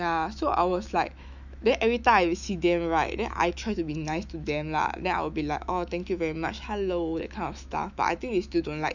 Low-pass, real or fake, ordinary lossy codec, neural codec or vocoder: 7.2 kHz; fake; none; autoencoder, 48 kHz, 128 numbers a frame, DAC-VAE, trained on Japanese speech